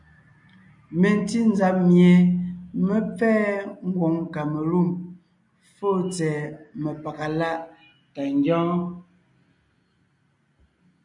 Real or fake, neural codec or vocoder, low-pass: real; none; 10.8 kHz